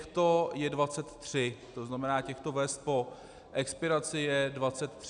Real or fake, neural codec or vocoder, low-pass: real; none; 9.9 kHz